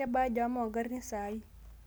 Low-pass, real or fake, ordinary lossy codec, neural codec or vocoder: none; real; none; none